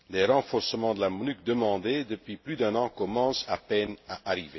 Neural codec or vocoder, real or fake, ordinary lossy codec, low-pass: none; real; MP3, 24 kbps; 7.2 kHz